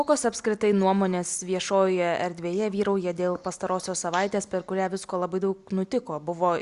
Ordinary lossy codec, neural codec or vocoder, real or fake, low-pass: Opus, 64 kbps; none; real; 10.8 kHz